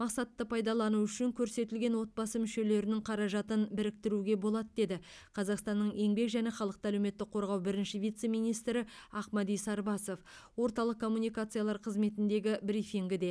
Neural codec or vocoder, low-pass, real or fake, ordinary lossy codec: none; none; real; none